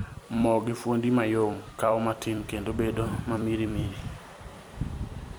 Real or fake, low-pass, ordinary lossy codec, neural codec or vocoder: fake; none; none; vocoder, 44.1 kHz, 128 mel bands every 256 samples, BigVGAN v2